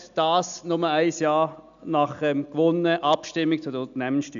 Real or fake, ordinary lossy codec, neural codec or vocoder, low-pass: real; none; none; 7.2 kHz